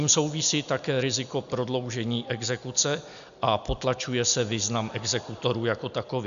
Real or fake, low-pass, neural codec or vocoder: real; 7.2 kHz; none